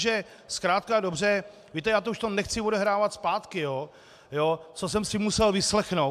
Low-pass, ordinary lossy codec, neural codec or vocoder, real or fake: 14.4 kHz; AAC, 96 kbps; none; real